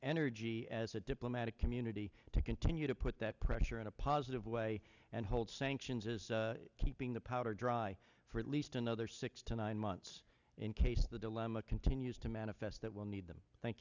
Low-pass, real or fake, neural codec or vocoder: 7.2 kHz; real; none